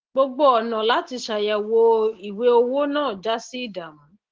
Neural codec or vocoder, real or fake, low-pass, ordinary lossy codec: none; real; 7.2 kHz; Opus, 16 kbps